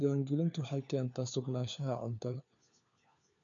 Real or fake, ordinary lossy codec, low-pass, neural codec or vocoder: fake; none; 7.2 kHz; codec, 16 kHz, 4 kbps, FunCodec, trained on LibriTTS, 50 frames a second